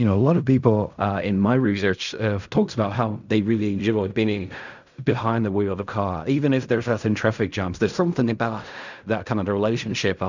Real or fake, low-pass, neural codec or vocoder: fake; 7.2 kHz; codec, 16 kHz in and 24 kHz out, 0.4 kbps, LongCat-Audio-Codec, fine tuned four codebook decoder